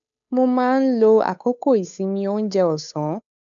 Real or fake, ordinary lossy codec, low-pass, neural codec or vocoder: fake; none; 7.2 kHz; codec, 16 kHz, 8 kbps, FunCodec, trained on Chinese and English, 25 frames a second